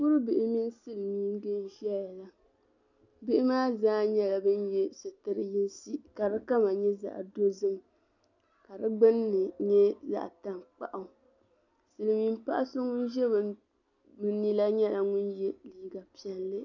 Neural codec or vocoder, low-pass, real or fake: none; 7.2 kHz; real